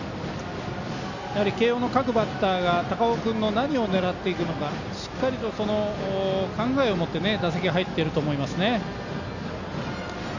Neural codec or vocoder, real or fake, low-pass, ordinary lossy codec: none; real; 7.2 kHz; none